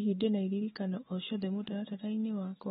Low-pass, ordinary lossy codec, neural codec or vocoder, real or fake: 19.8 kHz; AAC, 16 kbps; none; real